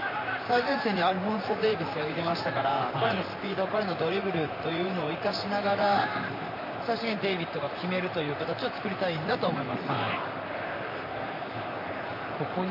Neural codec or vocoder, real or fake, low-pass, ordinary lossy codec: vocoder, 44.1 kHz, 128 mel bands, Pupu-Vocoder; fake; 5.4 kHz; MP3, 32 kbps